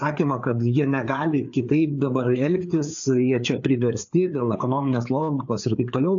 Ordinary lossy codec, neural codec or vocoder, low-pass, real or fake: AAC, 64 kbps; codec, 16 kHz, 4 kbps, FreqCodec, larger model; 7.2 kHz; fake